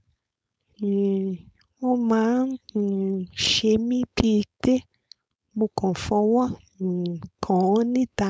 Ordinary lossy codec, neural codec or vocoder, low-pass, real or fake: none; codec, 16 kHz, 4.8 kbps, FACodec; none; fake